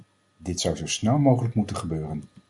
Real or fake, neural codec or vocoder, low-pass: real; none; 10.8 kHz